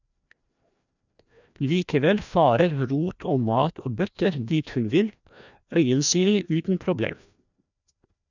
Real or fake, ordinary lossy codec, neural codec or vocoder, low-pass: fake; none; codec, 16 kHz, 1 kbps, FreqCodec, larger model; 7.2 kHz